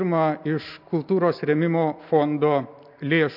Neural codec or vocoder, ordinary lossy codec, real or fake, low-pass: none; MP3, 48 kbps; real; 5.4 kHz